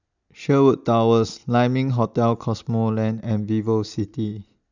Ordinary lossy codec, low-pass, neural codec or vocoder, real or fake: none; 7.2 kHz; none; real